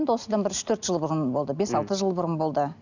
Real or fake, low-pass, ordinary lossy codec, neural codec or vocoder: real; 7.2 kHz; none; none